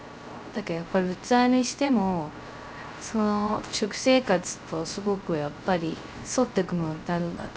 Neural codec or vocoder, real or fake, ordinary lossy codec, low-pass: codec, 16 kHz, 0.3 kbps, FocalCodec; fake; none; none